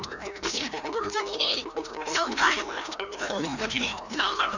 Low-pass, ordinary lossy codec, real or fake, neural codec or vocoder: 7.2 kHz; none; fake; codec, 16 kHz, 1 kbps, FreqCodec, larger model